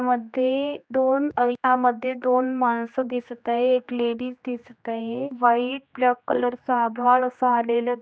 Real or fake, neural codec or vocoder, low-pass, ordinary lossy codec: fake; codec, 16 kHz, 2 kbps, X-Codec, HuBERT features, trained on general audio; none; none